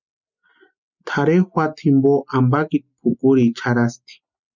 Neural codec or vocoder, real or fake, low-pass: none; real; 7.2 kHz